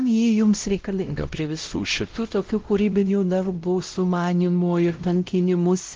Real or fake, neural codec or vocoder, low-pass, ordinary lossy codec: fake; codec, 16 kHz, 0.5 kbps, X-Codec, WavLM features, trained on Multilingual LibriSpeech; 7.2 kHz; Opus, 16 kbps